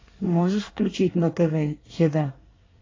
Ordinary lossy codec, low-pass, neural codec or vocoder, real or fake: AAC, 32 kbps; 7.2 kHz; codec, 24 kHz, 1 kbps, SNAC; fake